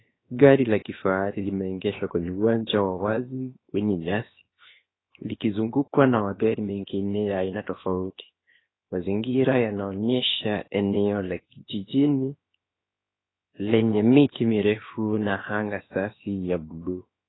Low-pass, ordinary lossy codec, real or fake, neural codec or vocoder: 7.2 kHz; AAC, 16 kbps; fake; codec, 16 kHz, 0.7 kbps, FocalCodec